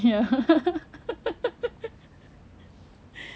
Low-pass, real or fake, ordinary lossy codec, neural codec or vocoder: none; real; none; none